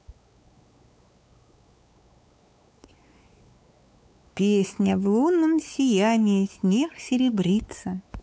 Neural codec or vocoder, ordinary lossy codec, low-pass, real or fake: codec, 16 kHz, 4 kbps, X-Codec, WavLM features, trained on Multilingual LibriSpeech; none; none; fake